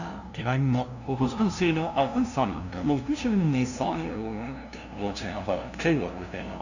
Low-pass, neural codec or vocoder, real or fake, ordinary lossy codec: 7.2 kHz; codec, 16 kHz, 0.5 kbps, FunCodec, trained on LibriTTS, 25 frames a second; fake; none